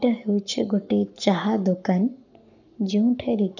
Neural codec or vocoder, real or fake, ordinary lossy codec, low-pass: codec, 44.1 kHz, 7.8 kbps, Pupu-Codec; fake; none; 7.2 kHz